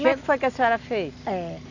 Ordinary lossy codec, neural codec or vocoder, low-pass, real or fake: none; none; 7.2 kHz; real